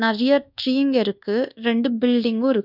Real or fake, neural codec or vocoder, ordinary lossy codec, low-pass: fake; codec, 24 kHz, 1.2 kbps, DualCodec; none; 5.4 kHz